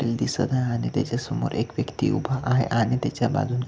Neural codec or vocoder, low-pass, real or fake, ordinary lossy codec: none; none; real; none